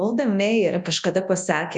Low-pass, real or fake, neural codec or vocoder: 10.8 kHz; fake; codec, 24 kHz, 0.9 kbps, WavTokenizer, large speech release